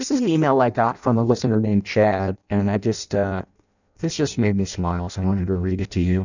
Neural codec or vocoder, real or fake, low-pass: codec, 16 kHz in and 24 kHz out, 0.6 kbps, FireRedTTS-2 codec; fake; 7.2 kHz